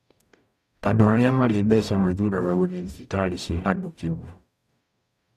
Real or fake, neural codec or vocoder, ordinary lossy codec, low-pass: fake; codec, 44.1 kHz, 0.9 kbps, DAC; none; 14.4 kHz